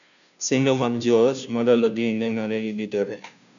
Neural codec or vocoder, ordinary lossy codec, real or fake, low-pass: codec, 16 kHz, 0.5 kbps, FunCodec, trained on Chinese and English, 25 frames a second; none; fake; 7.2 kHz